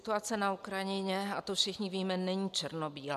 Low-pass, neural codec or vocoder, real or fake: 14.4 kHz; none; real